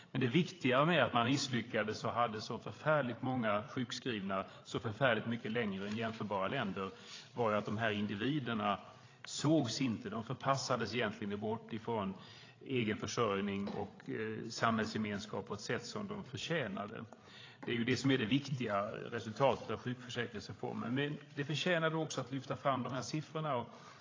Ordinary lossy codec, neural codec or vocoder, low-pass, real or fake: AAC, 32 kbps; codec, 16 kHz, 8 kbps, FreqCodec, larger model; 7.2 kHz; fake